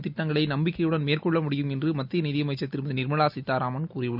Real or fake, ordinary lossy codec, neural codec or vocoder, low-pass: real; none; none; 5.4 kHz